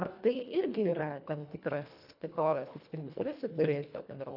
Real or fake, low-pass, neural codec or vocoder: fake; 5.4 kHz; codec, 24 kHz, 1.5 kbps, HILCodec